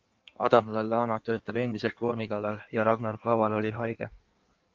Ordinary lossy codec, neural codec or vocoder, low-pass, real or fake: Opus, 24 kbps; codec, 16 kHz in and 24 kHz out, 1.1 kbps, FireRedTTS-2 codec; 7.2 kHz; fake